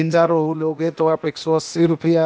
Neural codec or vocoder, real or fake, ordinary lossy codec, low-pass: codec, 16 kHz, 0.8 kbps, ZipCodec; fake; none; none